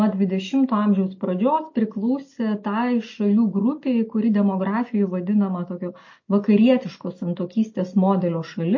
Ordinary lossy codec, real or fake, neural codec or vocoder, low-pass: MP3, 32 kbps; real; none; 7.2 kHz